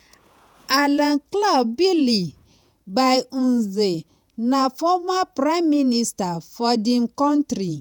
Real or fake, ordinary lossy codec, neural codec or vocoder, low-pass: fake; none; vocoder, 48 kHz, 128 mel bands, Vocos; none